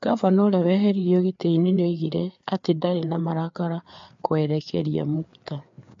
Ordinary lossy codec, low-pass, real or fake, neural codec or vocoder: MP3, 48 kbps; 7.2 kHz; fake; codec, 16 kHz, 4 kbps, FreqCodec, larger model